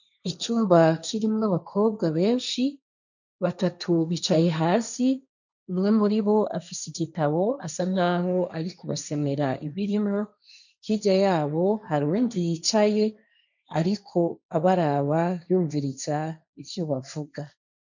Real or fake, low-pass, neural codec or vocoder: fake; 7.2 kHz; codec, 16 kHz, 1.1 kbps, Voila-Tokenizer